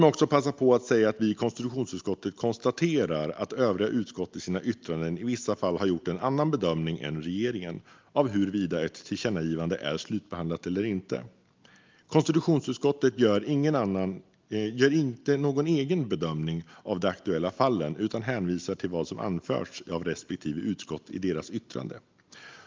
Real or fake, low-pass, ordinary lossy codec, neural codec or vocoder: real; 7.2 kHz; Opus, 24 kbps; none